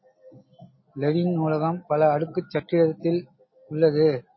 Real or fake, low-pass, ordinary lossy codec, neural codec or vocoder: real; 7.2 kHz; MP3, 24 kbps; none